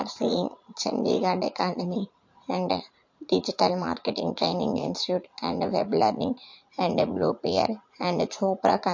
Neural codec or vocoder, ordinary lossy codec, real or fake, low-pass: vocoder, 44.1 kHz, 80 mel bands, Vocos; MP3, 48 kbps; fake; 7.2 kHz